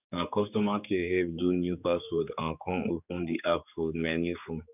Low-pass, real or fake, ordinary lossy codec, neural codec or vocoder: 3.6 kHz; fake; none; codec, 16 kHz, 4 kbps, X-Codec, HuBERT features, trained on general audio